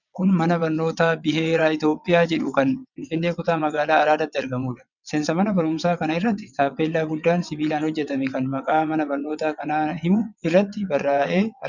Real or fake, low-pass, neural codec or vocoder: fake; 7.2 kHz; vocoder, 22.05 kHz, 80 mel bands, WaveNeXt